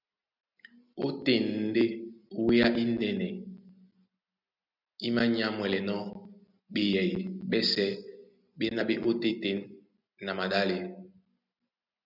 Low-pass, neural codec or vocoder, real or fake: 5.4 kHz; none; real